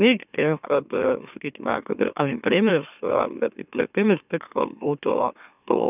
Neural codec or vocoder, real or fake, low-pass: autoencoder, 44.1 kHz, a latent of 192 numbers a frame, MeloTTS; fake; 3.6 kHz